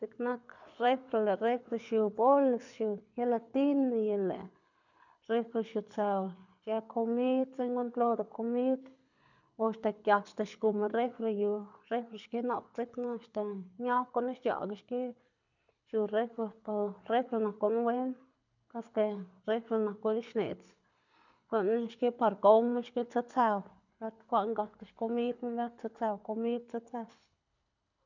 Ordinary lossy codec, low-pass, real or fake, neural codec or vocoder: none; 7.2 kHz; fake; codec, 24 kHz, 6 kbps, HILCodec